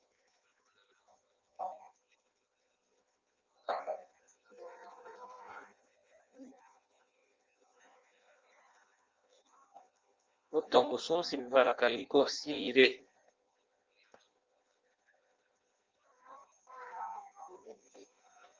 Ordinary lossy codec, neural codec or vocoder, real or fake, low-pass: Opus, 32 kbps; codec, 16 kHz in and 24 kHz out, 0.6 kbps, FireRedTTS-2 codec; fake; 7.2 kHz